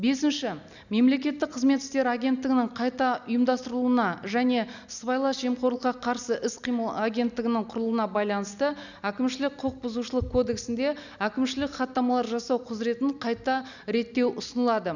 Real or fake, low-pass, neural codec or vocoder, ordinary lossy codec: real; 7.2 kHz; none; none